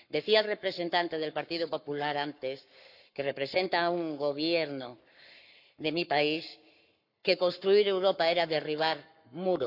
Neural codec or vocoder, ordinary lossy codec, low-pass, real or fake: codec, 44.1 kHz, 7.8 kbps, Pupu-Codec; none; 5.4 kHz; fake